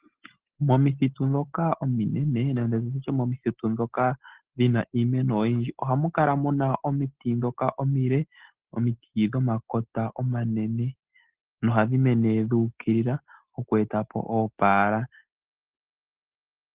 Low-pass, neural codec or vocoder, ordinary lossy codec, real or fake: 3.6 kHz; none; Opus, 16 kbps; real